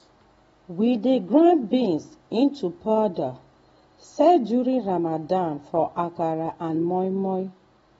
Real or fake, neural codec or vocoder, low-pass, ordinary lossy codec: real; none; 19.8 kHz; AAC, 24 kbps